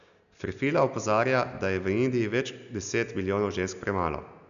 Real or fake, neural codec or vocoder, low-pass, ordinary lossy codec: real; none; 7.2 kHz; none